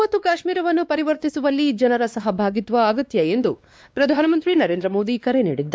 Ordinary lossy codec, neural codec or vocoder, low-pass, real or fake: none; codec, 16 kHz, 2 kbps, X-Codec, WavLM features, trained on Multilingual LibriSpeech; none; fake